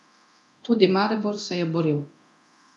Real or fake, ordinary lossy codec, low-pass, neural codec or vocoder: fake; none; none; codec, 24 kHz, 0.9 kbps, DualCodec